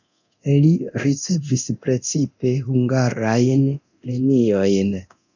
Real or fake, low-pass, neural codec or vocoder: fake; 7.2 kHz; codec, 24 kHz, 0.9 kbps, DualCodec